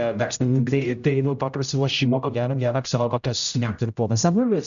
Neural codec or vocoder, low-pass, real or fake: codec, 16 kHz, 0.5 kbps, X-Codec, HuBERT features, trained on general audio; 7.2 kHz; fake